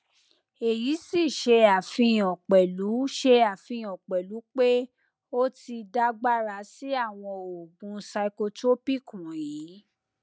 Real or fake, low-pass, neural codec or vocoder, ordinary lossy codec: real; none; none; none